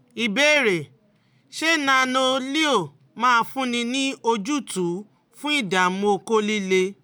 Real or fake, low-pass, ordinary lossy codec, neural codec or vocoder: real; none; none; none